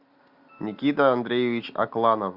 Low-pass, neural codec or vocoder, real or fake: 5.4 kHz; none; real